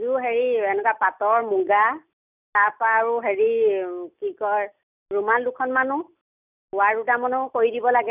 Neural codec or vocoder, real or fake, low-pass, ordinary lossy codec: none; real; 3.6 kHz; none